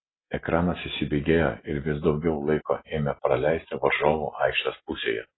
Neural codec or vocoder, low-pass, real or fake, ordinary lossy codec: none; 7.2 kHz; real; AAC, 16 kbps